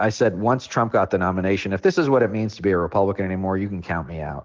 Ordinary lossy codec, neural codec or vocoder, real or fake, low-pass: Opus, 16 kbps; none; real; 7.2 kHz